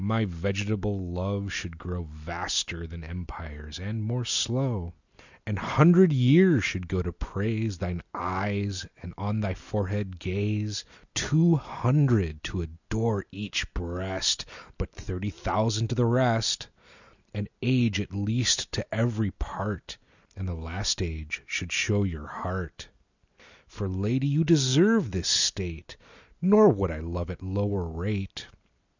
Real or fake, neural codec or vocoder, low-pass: real; none; 7.2 kHz